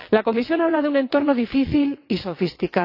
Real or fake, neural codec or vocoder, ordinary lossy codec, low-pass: fake; vocoder, 22.05 kHz, 80 mel bands, WaveNeXt; AAC, 32 kbps; 5.4 kHz